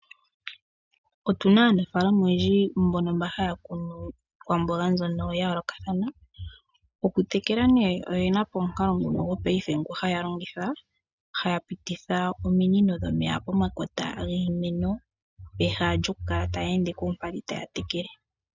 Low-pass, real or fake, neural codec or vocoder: 7.2 kHz; real; none